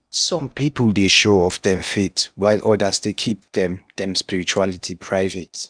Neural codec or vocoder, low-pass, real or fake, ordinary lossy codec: codec, 16 kHz in and 24 kHz out, 0.8 kbps, FocalCodec, streaming, 65536 codes; 9.9 kHz; fake; none